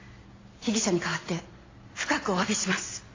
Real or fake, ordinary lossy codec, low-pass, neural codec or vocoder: real; AAC, 32 kbps; 7.2 kHz; none